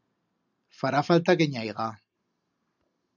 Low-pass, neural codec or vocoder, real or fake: 7.2 kHz; none; real